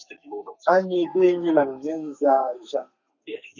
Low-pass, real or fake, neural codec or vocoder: 7.2 kHz; fake; codec, 32 kHz, 1.9 kbps, SNAC